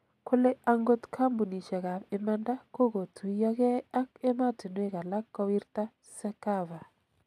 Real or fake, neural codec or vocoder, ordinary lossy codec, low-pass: real; none; none; 14.4 kHz